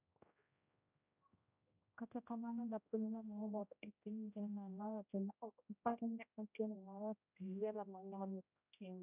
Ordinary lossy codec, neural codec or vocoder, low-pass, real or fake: none; codec, 16 kHz, 0.5 kbps, X-Codec, HuBERT features, trained on general audio; 3.6 kHz; fake